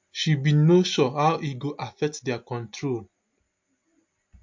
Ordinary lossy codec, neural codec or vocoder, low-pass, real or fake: MP3, 48 kbps; none; 7.2 kHz; real